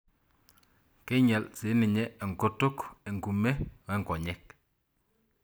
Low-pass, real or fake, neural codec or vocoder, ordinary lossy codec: none; real; none; none